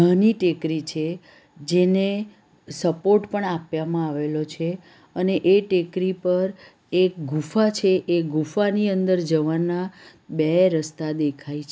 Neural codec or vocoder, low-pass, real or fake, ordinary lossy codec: none; none; real; none